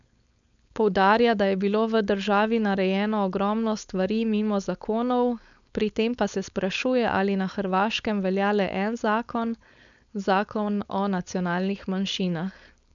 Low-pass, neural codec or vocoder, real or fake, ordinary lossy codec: 7.2 kHz; codec, 16 kHz, 4.8 kbps, FACodec; fake; none